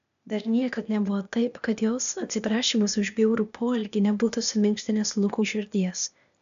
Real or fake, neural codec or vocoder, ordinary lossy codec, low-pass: fake; codec, 16 kHz, 0.8 kbps, ZipCodec; AAC, 96 kbps; 7.2 kHz